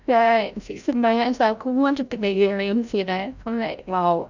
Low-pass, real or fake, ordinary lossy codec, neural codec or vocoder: 7.2 kHz; fake; none; codec, 16 kHz, 0.5 kbps, FreqCodec, larger model